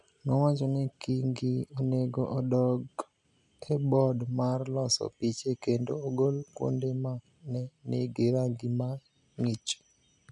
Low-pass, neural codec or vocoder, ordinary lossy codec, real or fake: 10.8 kHz; none; none; real